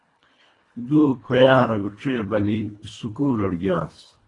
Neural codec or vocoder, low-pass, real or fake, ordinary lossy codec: codec, 24 kHz, 1.5 kbps, HILCodec; 10.8 kHz; fake; AAC, 32 kbps